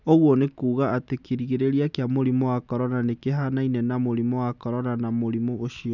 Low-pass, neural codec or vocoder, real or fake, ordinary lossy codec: 7.2 kHz; none; real; none